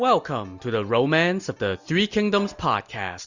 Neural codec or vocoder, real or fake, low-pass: none; real; 7.2 kHz